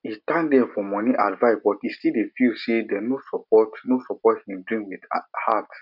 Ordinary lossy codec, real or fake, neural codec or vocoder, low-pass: none; real; none; 5.4 kHz